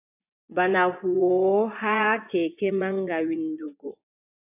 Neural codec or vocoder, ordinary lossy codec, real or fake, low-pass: vocoder, 24 kHz, 100 mel bands, Vocos; AAC, 32 kbps; fake; 3.6 kHz